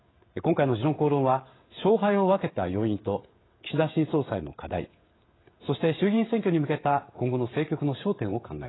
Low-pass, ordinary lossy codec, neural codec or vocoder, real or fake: 7.2 kHz; AAC, 16 kbps; codec, 16 kHz, 16 kbps, FreqCodec, smaller model; fake